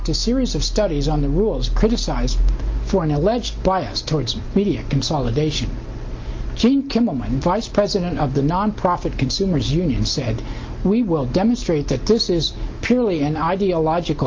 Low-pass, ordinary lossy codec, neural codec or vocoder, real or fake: 7.2 kHz; Opus, 32 kbps; none; real